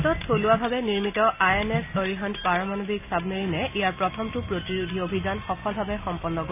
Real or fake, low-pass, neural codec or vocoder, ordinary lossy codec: real; 3.6 kHz; none; none